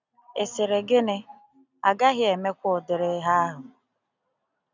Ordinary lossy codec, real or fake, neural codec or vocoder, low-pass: none; real; none; 7.2 kHz